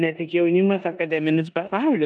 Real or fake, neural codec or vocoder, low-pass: fake; codec, 16 kHz in and 24 kHz out, 0.9 kbps, LongCat-Audio-Codec, four codebook decoder; 9.9 kHz